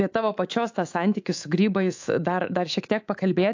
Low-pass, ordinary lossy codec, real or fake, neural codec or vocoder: 7.2 kHz; AAC, 48 kbps; fake; codec, 24 kHz, 3.1 kbps, DualCodec